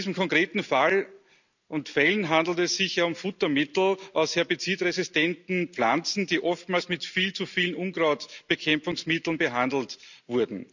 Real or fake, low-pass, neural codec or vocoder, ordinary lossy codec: real; 7.2 kHz; none; none